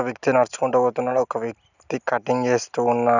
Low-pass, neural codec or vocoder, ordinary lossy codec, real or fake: 7.2 kHz; none; none; real